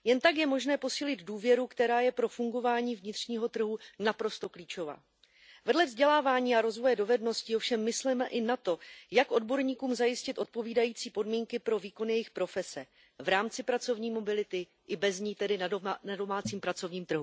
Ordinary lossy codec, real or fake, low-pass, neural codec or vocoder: none; real; none; none